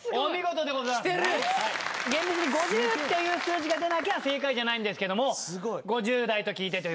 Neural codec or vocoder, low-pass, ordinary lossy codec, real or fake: none; none; none; real